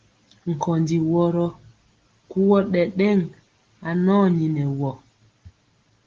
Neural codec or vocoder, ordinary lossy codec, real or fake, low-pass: none; Opus, 16 kbps; real; 7.2 kHz